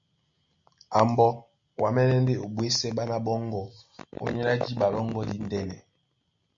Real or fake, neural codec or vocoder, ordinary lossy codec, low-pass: real; none; MP3, 64 kbps; 7.2 kHz